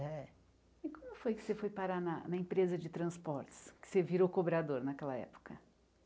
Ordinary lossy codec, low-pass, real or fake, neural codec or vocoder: none; none; real; none